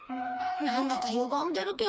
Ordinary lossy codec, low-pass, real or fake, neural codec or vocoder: none; none; fake; codec, 16 kHz, 2 kbps, FreqCodec, smaller model